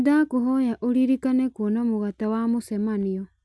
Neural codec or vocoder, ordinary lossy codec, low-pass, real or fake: none; none; none; real